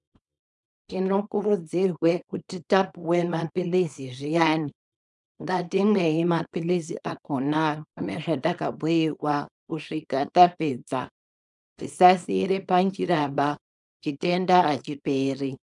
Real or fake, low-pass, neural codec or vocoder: fake; 10.8 kHz; codec, 24 kHz, 0.9 kbps, WavTokenizer, small release